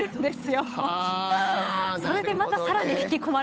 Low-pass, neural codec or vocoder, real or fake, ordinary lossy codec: none; codec, 16 kHz, 8 kbps, FunCodec, trained on Chinese and English, 25 frames a second; fake; none